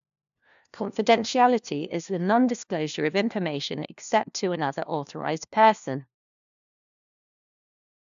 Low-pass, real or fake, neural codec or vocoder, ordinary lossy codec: 7.2 kHz; fake; codec, 16 kHz, 1 kbps, FunCodec, trained on LibriTTS, 50 frames a second; AAC, 96 kbps